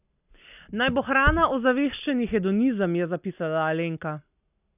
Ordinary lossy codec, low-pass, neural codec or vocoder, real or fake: none; 3.6 kHz; none; real